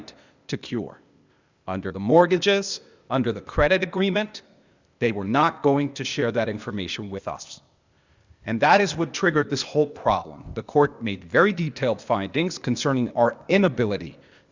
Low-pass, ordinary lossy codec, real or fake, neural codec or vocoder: 7.2 kHz; Opus, 64 kbps; fake; codec, 16 kHz, 0.8 kbps, ZipCodec